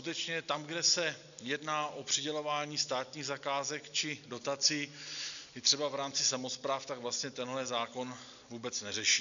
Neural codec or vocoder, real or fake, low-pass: none; real; 7.2 kHz